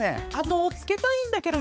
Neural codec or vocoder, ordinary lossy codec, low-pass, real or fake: codec, 16 kHz, 4 kbps, X-Codec, HuBERT features, trained on balanced general audio; none; none; fake